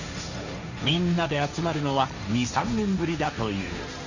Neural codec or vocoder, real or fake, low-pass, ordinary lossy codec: codec, 16 kHz, 1.1 kbps, Voila-Tokenizer; fake; 7.2 kHz; none